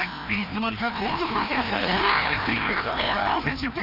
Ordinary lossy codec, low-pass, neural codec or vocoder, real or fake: none; 5.4 kHz; codec, 16 kHz, 1 kbps, FreqCodec, larger model; fake